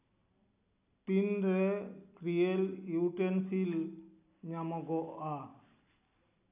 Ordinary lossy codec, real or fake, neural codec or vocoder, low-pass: none; real; none; 3.6 kHz